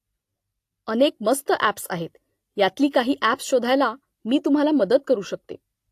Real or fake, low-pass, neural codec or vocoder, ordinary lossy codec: real; 14.4 kHz; none; AAC, 64 kbps